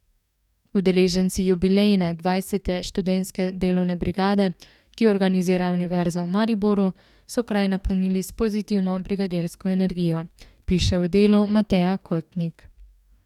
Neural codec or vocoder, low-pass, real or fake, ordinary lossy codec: codec, 44.1 kHz, 2.6 kbps, DAC; 19.8 kHz; fake; none